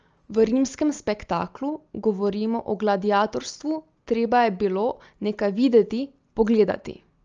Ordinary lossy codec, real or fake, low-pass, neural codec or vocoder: Opus, 24 kbps; real; 7.2 kHz; none